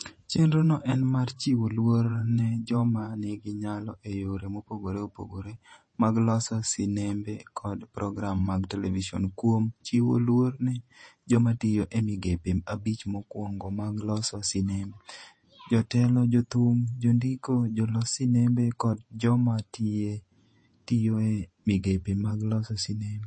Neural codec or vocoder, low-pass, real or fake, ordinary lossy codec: vocoder, 48 kHz, 128 mel bands, Vocos; 9.9 kHz; fake; MP3, 32 kbps